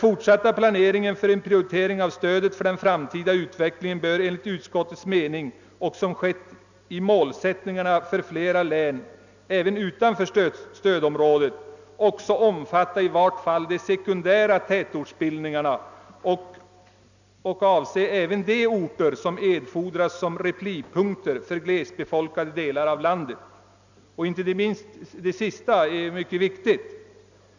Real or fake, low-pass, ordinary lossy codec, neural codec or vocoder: real; 7.2 kHz; none; none